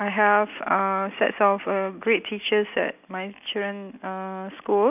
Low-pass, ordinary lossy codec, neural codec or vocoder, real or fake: 3.6 kHz; none; none; real